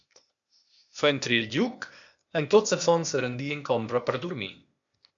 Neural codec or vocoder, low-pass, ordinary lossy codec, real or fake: codec, 16 kHz, 0.8 kbps, ZipCodec; 7.2 kHz; MP3, 64 kbps; fake